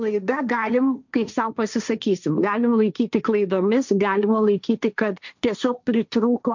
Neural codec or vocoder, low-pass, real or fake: codec, 16 kHz, 1.1 kbps, Voila-Tokenizer; 7.2 kHz; fake